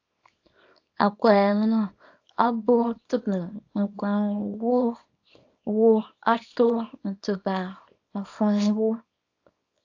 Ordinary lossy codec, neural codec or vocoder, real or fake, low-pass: AAC, 48 kbps; codec, 24 kHz, 0.9 kbps, WavTokenizer, small release; fake; 7.2 kHz